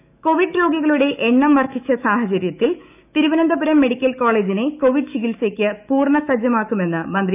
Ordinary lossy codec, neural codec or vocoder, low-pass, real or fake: none; autoencoder, 48 kHz, 128 numbers a frame, DAC-VAE, trained on Japanese speech; 3.6 kHz; fake